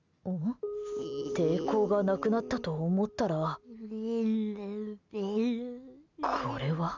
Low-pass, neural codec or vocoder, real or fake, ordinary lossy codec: 7.2 kHz; none; real; none